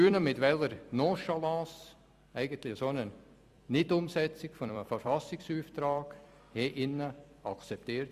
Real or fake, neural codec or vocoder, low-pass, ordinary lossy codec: fake; vocoder, 44.1 kHz, 128 mel bands every 256 samples, BigVGAN v2; 14.4 kHz; Opus, 64 kbps